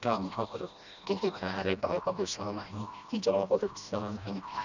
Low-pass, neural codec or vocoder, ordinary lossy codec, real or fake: 7.2 kHz; codec, 16 kHz, 1 kbps, FreqCodec, smaller model; none; fake